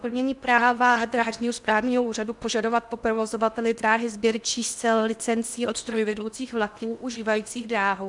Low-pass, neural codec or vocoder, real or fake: 10.8 kHz; codec, 16 kHz in and 24 kHz out, 0.8 kbps, FocalCodec, streaming, 65536 codes; fake